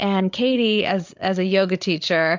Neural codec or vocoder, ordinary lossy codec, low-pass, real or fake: codec, 16 kHz, 8 kbps, FreqCodec, larger model; MP3, 64 kbps; 7.2 kHz; fake